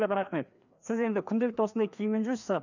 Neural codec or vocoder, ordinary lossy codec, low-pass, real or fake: codec, 16 kHz, 2 kbps, FreqCodec, larger model; none; 7.2 kHz; fake